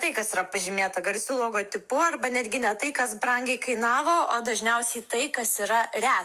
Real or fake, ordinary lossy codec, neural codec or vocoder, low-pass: fake; Opus, 32 kbps; autoencoder, 48 kHz, 128 numbers a frame, DAC-VAE, trained on Japanese speech; 14.4 kHz